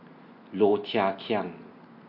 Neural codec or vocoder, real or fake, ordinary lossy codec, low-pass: none; real; MP3, 32 kbps; 5.4 kHz